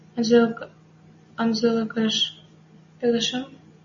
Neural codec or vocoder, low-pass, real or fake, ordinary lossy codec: none; 7.2 kHz; real; MP3, 32 kbps